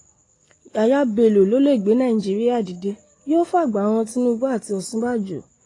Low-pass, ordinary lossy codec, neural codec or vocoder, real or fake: 10.8 kHz; AAC, 32 kbps; none; real